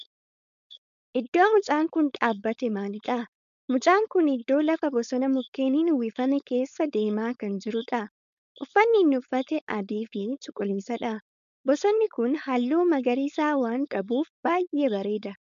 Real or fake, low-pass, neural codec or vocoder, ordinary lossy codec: fake; 7.2 kHz; codec, 16 kHz, 4.8 kbps, FACodec; MP3, 96 kbps